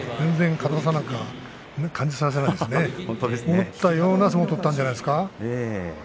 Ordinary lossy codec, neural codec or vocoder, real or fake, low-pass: none; none; real; none